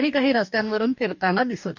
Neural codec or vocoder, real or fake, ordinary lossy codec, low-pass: codec, 44.1 kHz, 2.6 kbps, DAC; fake; none; 7.2 kHz